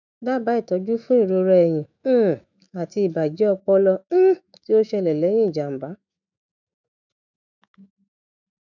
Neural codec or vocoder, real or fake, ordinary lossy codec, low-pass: autoencoder, 48 kHz, 128 numbers a frame, DAC-VAE, trained on Japanese speech; fake; none; 7.2 kHz